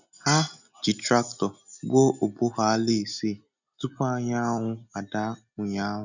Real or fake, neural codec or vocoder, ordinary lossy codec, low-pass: real; none; none; 7.2 kHz